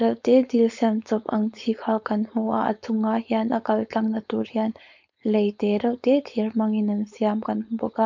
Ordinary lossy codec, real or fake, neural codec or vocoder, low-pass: MP3, 64 kbps; fake; codec, 16 kHz, 4.8 kbps, FACodec; 7.2 kHz